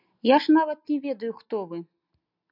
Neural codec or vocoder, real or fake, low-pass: none; real; 5.4 kHz